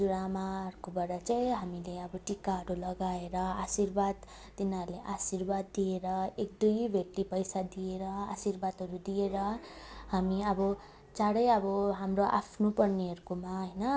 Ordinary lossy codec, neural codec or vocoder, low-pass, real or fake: none; none; none; real